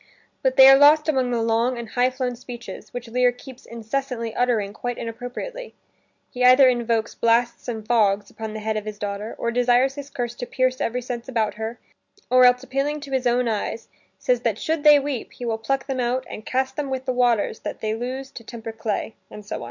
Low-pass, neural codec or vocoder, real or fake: 7.2 kHz; none; real